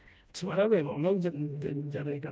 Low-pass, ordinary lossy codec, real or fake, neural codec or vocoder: none; none; fake; codec, 16 kHz, 1 kbps, FreqCodec, smaller model